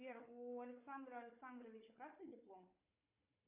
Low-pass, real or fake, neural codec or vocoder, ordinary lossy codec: 3.6 kHz; fake; codec, 16 kHz, 4 kbps, FunCodec, trained on Chinese and English, 50 frames a second; AAC, 32 kbps